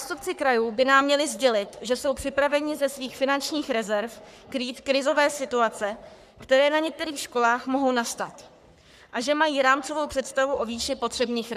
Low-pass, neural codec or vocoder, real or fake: 14.4 kHz; codec, 44.1 kHz, 3.4 kbps, Pupu-Codec; fake